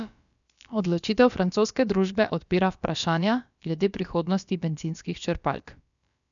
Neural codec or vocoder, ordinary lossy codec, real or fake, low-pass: codec, 16 kHz, about 1 kbps, DyCAST, with the encoder's durations; none; fake; 7.2 kHz